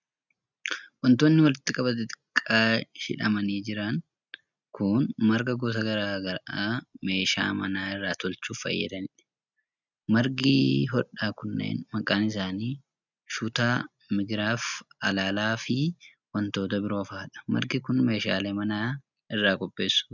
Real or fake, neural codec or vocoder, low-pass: real; none; 7.2 kHz